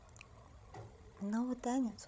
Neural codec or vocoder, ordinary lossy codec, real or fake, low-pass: codec, 16 kHz, 16 kbps, FreqCodec, larger model; none; fake; none